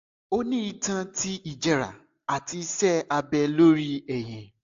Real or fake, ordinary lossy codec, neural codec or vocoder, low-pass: real; AAC, 64 kbps; none; 7.2 kHz